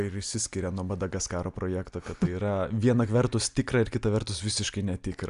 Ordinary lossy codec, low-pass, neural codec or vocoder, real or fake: MP3, 96 kbps; 10.8 kHz; none; real